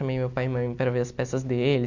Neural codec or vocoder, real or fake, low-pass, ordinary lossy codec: none; real; 7.2 kHz; none